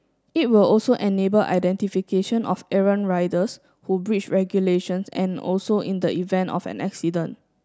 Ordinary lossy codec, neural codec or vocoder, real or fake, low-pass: none; none; real; none